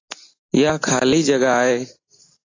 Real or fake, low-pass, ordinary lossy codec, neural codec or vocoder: real; 7.2 kHz; AAC, 32 kbps; none